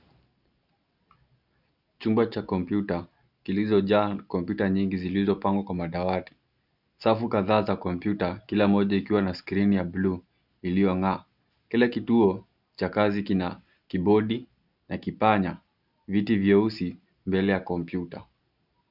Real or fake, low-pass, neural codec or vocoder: real; 5.4 kHz; none